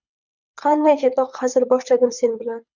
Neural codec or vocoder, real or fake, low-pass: codec, 24 kHz, 6 kbps, HILCodec; fake; 7.2 kHz